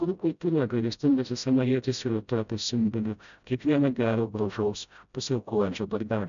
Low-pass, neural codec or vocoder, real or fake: 7.2 kHz; codec, 16 kHz, 0.5 kbps, FreqCodec, smaller model; fake